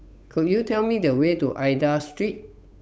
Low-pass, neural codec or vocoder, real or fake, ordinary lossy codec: none; codec, 16 kHz, 8 kbps, FunCodec, trained on Chinese and English, 25 frames a second; fake; none